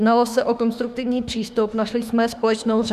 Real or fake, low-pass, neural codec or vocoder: fake; 14.4 kHz; autoencoder, 48 kHz, 32 numbers a frame, DAC-VAE, trained on Japanese speech